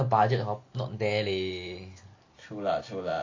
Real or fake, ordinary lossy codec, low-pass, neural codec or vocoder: real; AAC, 48 kbps; 7.2 kHz; none